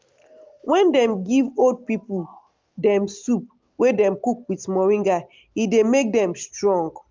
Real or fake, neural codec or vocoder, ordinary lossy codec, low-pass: real; none; Opus, 32 kbps; 7.2 kHz